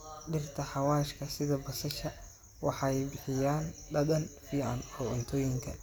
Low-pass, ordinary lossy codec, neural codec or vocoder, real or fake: none; none; vocoder, 44.1 kHz, 128 mel bands every 256 samples, BigVGAN v2; fake